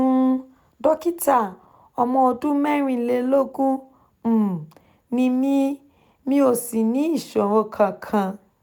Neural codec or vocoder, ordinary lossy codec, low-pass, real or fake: none; none; none; real